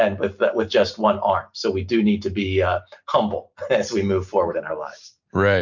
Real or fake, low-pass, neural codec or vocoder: real; 7.2 kHz; none